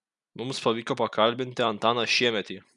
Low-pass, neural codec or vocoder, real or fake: 10.8 kHz; none; real